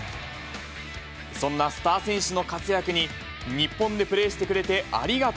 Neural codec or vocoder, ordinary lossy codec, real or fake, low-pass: none; none; real; none